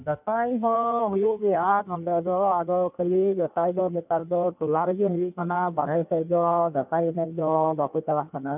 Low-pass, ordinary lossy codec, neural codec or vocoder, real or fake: 3.6 kHz; none; codec, 16 kHz in and 24 kHz out, 1.1 kbps, FireRedTTS-2 codec; fake